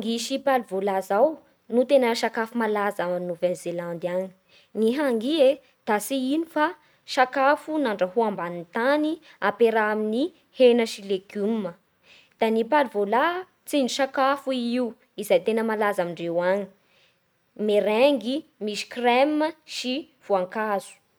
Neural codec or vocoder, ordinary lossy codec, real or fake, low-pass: none; none; real; none